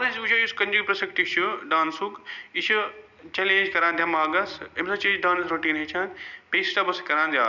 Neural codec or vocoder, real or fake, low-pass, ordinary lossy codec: none; real; 7.2 kHz; none